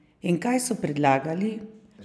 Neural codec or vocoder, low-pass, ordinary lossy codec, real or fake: none; none; none; real